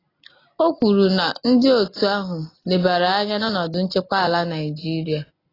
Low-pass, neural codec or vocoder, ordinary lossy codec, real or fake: 5.4 kHz; none; AAC, 24 kbps; real